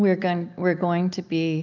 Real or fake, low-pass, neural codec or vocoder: real; 7.2 kHz; none